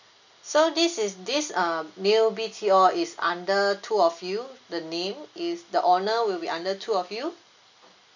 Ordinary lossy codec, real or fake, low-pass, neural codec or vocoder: none; real; 7.2 kHz; none